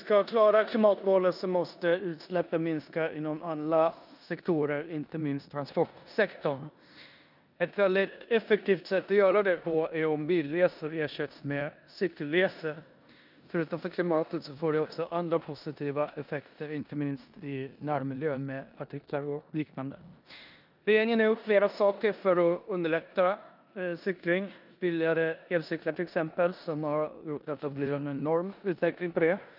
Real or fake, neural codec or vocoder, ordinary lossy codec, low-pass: fake; codec, 16 kHz in and 24 kHz out, 0.9 kbps, LongCat-Audio-Codec, four codebook decoder; none; 5.4 kHz